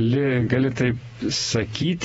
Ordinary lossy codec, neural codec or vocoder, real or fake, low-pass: AAC, 24 kbps; vocoder, 48 kHz, 128 mel bands, Vocos; fake; 19.8 kHz